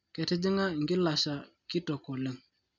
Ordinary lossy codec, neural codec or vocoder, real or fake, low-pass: none; none; real; 7.2 kHz